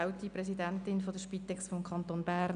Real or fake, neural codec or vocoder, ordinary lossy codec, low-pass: real; none; none; 9.9 kHz